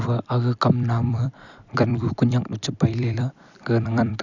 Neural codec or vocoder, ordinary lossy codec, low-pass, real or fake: vocoder, 44.1 kHz, 128 mel bands every 256 samples, BigVGAN v2; none; 7.2 kHz; fake